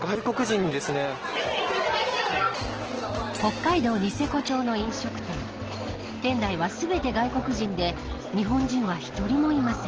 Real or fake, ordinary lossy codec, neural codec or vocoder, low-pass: real; Opus, 16 kbps; none; 7.2 kHz